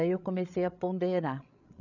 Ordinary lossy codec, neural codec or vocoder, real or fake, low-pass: none; codec, 16 kHz, 16 kbps, FreqCodec, larger model; fake; 7.2 kHz